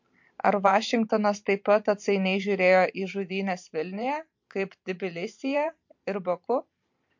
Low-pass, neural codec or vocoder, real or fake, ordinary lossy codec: 7.2 kHz; vocoder, 44.1 kHz, 128 mel bands every 512 samples, BigVGAN v2; fake; MP3, 48 kbps